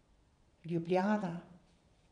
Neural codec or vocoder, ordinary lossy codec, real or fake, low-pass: vocoder, 22.05 kHz, 80 mel bands, Vocos; none; fake; 9.9 kHz